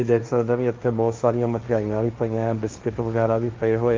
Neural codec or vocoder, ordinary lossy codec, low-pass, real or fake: codec, 16 kHz, 1.1 kbps, Voila-Tokenizer; Opus, 24 kbps; 7.2 kHz; fake